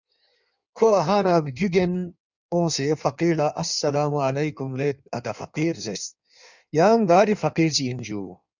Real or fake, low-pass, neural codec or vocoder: fake; 7.2 kHz; codec, 16 kHz in and 24 kHz out, 1.1 kbps, FireRedTTS-2 codec